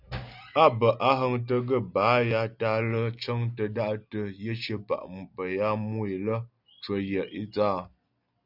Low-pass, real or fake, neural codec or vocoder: 5.4 kHz; real; none